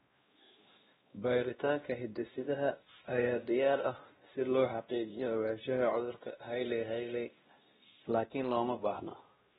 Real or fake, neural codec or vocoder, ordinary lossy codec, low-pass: fake; codec, 16 kHz, 1 kbps, X-Codec, WavLM features, trained on Multilingual LibriSpeech; AAC, 16 kbps; 7.2 kHz